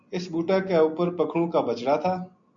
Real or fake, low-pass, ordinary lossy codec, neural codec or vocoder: real; 7.2 kHz; MP3, 48 kbps; none